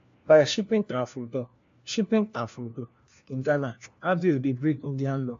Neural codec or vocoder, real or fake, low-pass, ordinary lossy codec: codec, 16 kHz, 1 kbps, FunCodec, trained on LibriTTS, 50 frames a second; fake; 7.2 kHz; AAC, 64 kbps